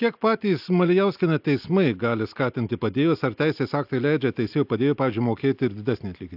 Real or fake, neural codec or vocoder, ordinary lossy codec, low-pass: real; none; AAC, 48 kbps; 5.4 kHz